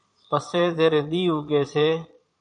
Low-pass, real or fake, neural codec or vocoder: 9.9 kHz; fake; vocoder, 22.05 kHz, 80 mel bands, Vocos